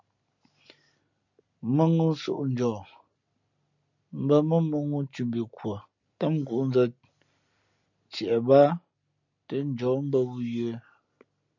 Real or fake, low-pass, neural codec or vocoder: real; 7.2 kHz; none